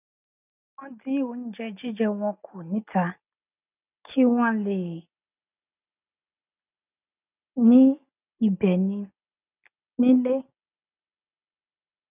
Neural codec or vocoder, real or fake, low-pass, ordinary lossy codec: none; real; 3.6 kHz; none